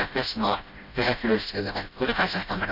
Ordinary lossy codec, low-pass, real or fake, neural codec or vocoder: none; 5.4 kHz; fake; codec, 16 kHz, 0.5 kbps, FreqCodec, smaller model